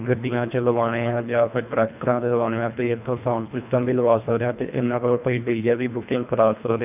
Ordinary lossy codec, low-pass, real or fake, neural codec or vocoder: none; 3.6 kHz; fake; codec, 24 kHz, 1.5 kbps, HILCodec